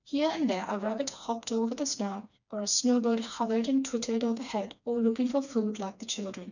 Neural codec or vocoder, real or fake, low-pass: codec, 16 kHz, 2 kbps, FreqCodec, smaller model; fake; 7.2 kHz